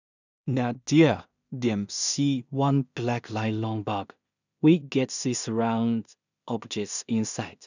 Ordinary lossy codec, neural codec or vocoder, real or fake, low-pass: none; codec, 16 kHz in and 24 kHz out, 0.4 kbps, LongCat-Audio-Codec, two codebook decoder; fake; 7.2 kHz